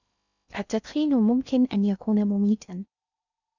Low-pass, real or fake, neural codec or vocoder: 7.2 kHz; fake; codec, 16 kHz in and 24 kHz out, 0.6 kbps, FocalCodec, streaming, 2048 codes